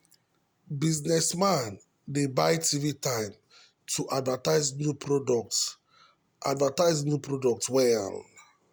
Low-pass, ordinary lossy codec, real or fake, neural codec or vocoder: none; none; real; none